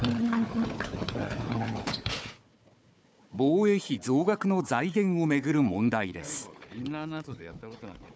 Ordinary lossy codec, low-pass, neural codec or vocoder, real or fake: none; none; codec, 16 kHz, 4 kbps, FunCodec, trained on Chinese and English, 50 frames a second; fake